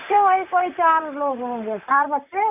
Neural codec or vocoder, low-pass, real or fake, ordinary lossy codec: vocoder, 44.1 kHz, 128 mel bands, Pupu-Vocoder; 3.6 kHz; fake; none